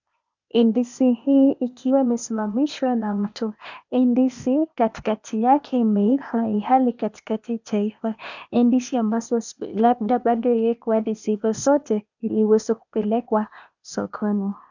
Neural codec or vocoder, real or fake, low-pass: codec, 16 kHz, 0.8 kbps, ZipCodec; fake; 7.2 kHz